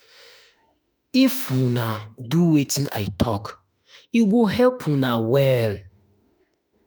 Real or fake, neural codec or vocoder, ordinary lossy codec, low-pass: fake; autoencoder, 48 kHz, 32 numbers a frame, DAC-VAE, trained on Japanese speech; none; none